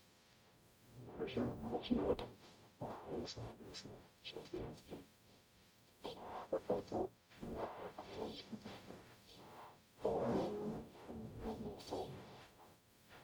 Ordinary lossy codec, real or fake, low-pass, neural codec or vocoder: none; fake; none; codec, 44.1 kHz, 0.9 kbps, DAC